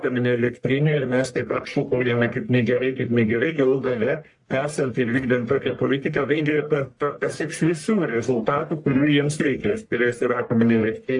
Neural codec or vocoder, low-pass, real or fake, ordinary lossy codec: codec, 44.1 kHz, 1.7 kbps, Pupu-Codec; 10.8 kHz; fake; AAC, 64 kbps